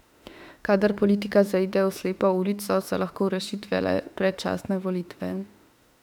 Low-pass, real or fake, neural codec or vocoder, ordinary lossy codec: 19.8 kHz; fake; autoencoder, 48 kHz, 32 numbers a frame, DAC-VAE, trained on Japanese speech; none